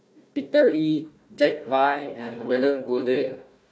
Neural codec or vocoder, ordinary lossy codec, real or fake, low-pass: codec, 16 kHz, 1 kbps, FunCodec, trained on Chinese and English, 50 frames a second; none; fake; none